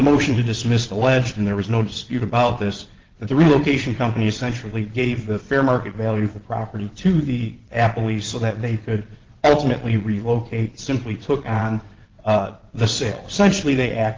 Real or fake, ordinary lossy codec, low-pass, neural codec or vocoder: fake; Opus, 16 kbps; 7.2 kHz; vocoder, 22.05 kHz, 80 mel bands, WaveNeXt